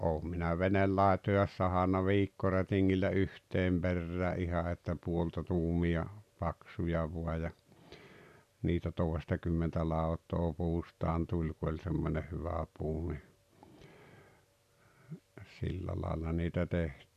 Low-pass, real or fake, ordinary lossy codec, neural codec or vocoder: 14.4 kHz; real; none; none